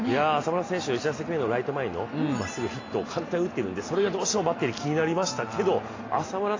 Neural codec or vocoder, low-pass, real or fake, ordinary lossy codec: none; 7.2 kHz; real; AAC, 32 kbps